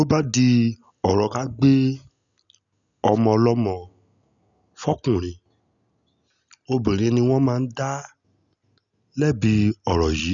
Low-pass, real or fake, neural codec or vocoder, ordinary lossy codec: 7.2 kHz; real; none; none